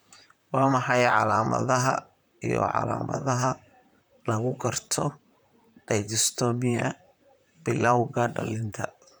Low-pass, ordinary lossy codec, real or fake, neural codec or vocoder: none; none; fake; vocoder, 44.1 kHz, 128 mel bands, Pupu-Vocoder